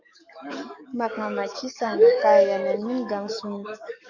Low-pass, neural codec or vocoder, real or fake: 7.2 kHz; codec, 44.1 kHz, 7.8 kbps, DAC; fake